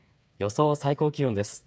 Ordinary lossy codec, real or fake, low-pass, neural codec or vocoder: none; fake; none; codec, 16 kHz, 8 kbps, FreqCodec, smaller model